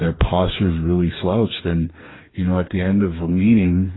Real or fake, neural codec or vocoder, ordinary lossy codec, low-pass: fake; codec, 44.1 kHz, 2.6 kbps, DAC; AAC, 16 kbps; 7.2 kHz